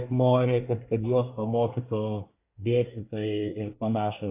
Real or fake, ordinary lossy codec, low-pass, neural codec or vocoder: fake; AAC, 24 kbps; 3.6 kHz; codec, 24 kHz, 1 kbps, SNAC